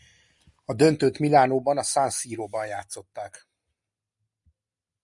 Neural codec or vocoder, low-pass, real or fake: none; 10.8 kHz; real